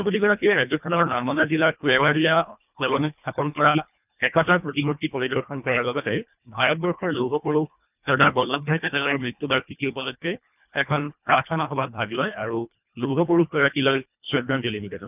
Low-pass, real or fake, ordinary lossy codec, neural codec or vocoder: 3.6 kHz; fake; none; codec, 24 kHz, 1.5 kbps, HILCodec